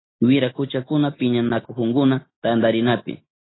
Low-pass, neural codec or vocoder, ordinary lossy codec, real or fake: 7.2 kHz; none; AAC, 16 kbps; real